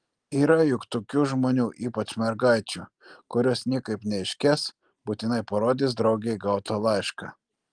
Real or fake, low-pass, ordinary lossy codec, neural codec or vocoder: real; 9.9 kHz; Opus, 24 kbps; none